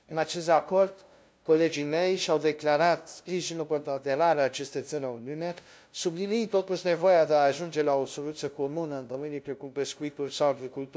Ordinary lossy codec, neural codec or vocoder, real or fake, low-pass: none; codec, 16 kHz, 0.5 kbps, FunCodec, trained on LibriTTS, 25 frames a second; fake; none